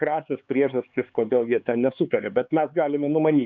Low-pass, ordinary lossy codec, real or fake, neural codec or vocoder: 7.2 kHz; MP3, 64 kbps; fake; codec, 16 kHz, 4 kbps, X-Codec, HuBERT features, trained on LibriSpeech